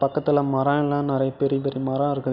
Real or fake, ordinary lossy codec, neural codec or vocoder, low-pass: real; none; none; 5.4 kHz